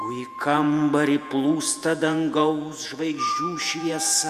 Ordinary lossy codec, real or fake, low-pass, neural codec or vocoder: Opus, 64 kbps; fake; 14.4 kHz; vocoder, 48 kHz, 128 mel bands, Vocos